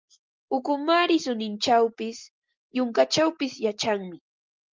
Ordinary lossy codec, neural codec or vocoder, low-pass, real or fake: Opus, 32 kbps; none; 7.2 kHz; real